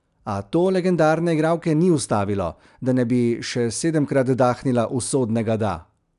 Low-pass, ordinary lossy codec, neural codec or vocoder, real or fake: 10.8 kHz; MP3, 96 kbps; none; real